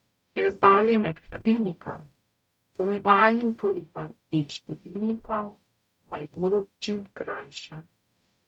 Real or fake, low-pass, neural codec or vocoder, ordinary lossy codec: fake; 19.8 kHz; codec, 44.1 kHz, 0.9 kbps, DAC; none